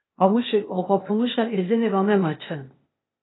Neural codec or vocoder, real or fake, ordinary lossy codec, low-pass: codec, 16 kHz, 0.8 kbps, ZipCodec; fake; AAC, 16 kbps; 7.2 kHz